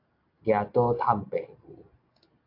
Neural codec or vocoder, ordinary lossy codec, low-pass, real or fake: none; AAC, 32 kbps; 5.4 kHz; real